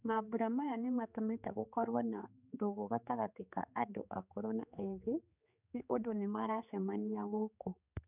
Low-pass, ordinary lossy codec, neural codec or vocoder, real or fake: 3.6 kHz; none; codec, 16 kHz, 4 kbps, X-Codec, HuBERT features, trained on general audio; fake